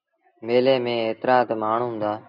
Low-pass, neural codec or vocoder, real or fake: 5.4 kHz; none; real